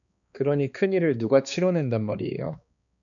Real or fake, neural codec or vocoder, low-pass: fake; codec, 16 kHz, 2 kbps, X-Codec, HuBERT features, trained on balanced general audio; 7.2 kHz